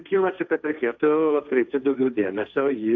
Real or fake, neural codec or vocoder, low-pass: fake; codec, 16 kHz, 1.1 kbps, Voila-Tokenizer; 7.2 kHz